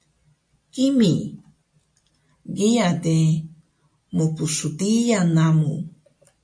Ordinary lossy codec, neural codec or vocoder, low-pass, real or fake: MP3, 48 kbps; none; 9.9 kHz; real